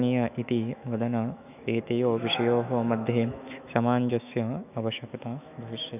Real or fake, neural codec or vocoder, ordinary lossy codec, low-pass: fake; autoencoder, 48 kHz, 128 numbers a frame, DAC-VAE, trained on Japanese speech; none; 3.6 kHz